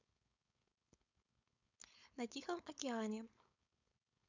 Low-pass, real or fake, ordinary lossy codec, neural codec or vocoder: 7.2 kHz; fake; none; codec, 16 kHz, 4.8 kbps, FACodec